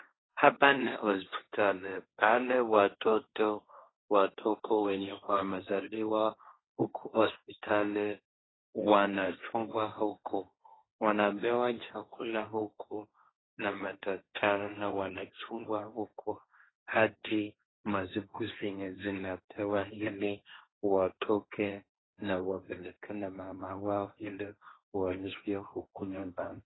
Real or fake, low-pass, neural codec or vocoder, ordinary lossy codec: fake; 7.2 kHz; codec, 16 kHz, 1.1 kbps, Voila-Tokenizer; AAC, 16 kbps